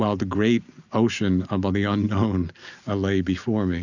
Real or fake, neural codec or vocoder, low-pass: real; none; 7.2 kHz